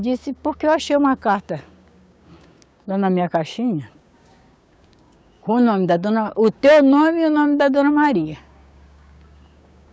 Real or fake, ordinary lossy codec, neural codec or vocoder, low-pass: fake; none; codec, 16 kHz, 6 kbps, DAC; none